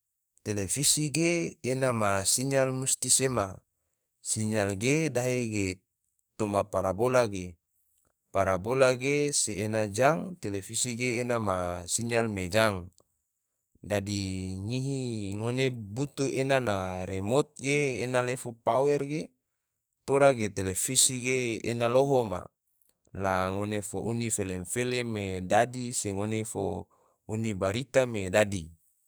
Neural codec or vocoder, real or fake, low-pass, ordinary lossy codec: codec, 44.1 kHz, 2.6 kbps, SNAC; fake; none; none